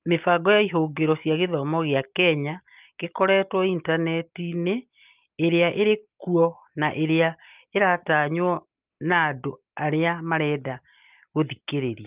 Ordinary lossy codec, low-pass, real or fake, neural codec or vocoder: Opus, 32 kbps; 3.6 kHz; real; none